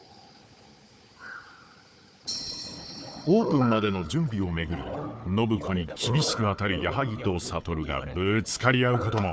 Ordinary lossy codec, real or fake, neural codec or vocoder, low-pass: none; fake; codec, 16 kHz, 4 kbps, FunCodec, trained on Chinese and English, 50 frames a second; none